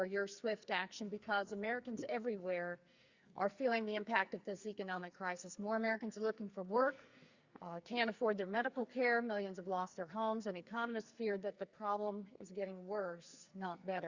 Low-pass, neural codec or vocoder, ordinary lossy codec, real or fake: 7.2 kHz; codec, 44.1 kHz, 2.6 kbps, SNAC; Opus, 64 kbps; fake